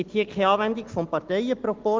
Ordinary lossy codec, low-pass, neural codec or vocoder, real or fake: Opus, 16 kbps; 7.2 kHz; none; real